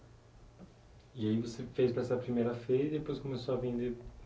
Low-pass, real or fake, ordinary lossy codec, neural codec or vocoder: none; real; none; none